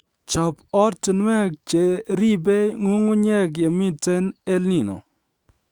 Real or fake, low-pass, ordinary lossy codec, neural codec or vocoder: fake; 19.8 kHz; Opus, 64 kbps; autoencoder, 48 kHz, 128 numbers a frame, DAC-VAE, trained on Japanese speech